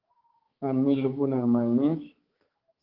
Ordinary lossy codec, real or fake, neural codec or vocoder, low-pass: Opus, 16 kbps; fake; codec, 16 kHz, 4 kbps, X-Codec, HuBERT features, trained on balanced general audio; 5.4 kHz